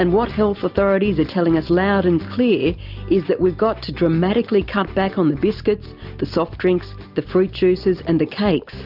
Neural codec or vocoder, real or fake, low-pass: none; real; 5.4 kHz